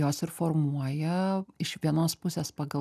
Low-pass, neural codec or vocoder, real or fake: 14.4 kHz; none; real